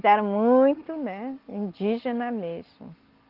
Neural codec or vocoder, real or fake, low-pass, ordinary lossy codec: none; real; 5.4 kHz; Opus, 16 kbps